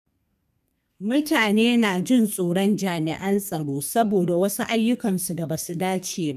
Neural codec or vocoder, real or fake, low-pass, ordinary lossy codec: codec, 32 kHz, 1.9 kbps, SNAC; fake; 14.4 kHz; none